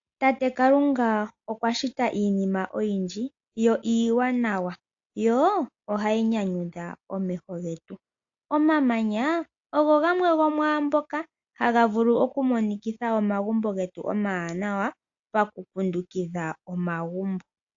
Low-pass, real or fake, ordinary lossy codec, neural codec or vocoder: 7.2 kHz; real; AAC, 48 kbps; none